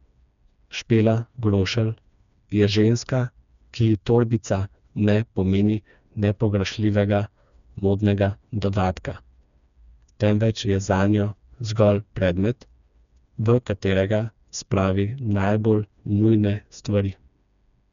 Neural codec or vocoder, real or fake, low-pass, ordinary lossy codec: codec, 16 kHz, 2 kbps, FreqCodec, smaller model; fake; 7.2 kHz; none